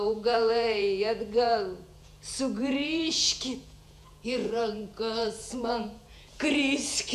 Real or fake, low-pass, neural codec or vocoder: fake; 14.4 kHz; vocoder, 48 kHz, 128 mel bands, Vocos